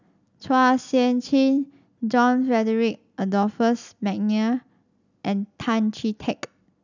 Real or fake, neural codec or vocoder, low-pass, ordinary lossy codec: real; none; 7.2 kHz; none